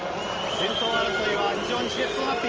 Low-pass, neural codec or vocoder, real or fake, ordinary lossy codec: 7.2 kHz; none; real; Opus, 24 kbps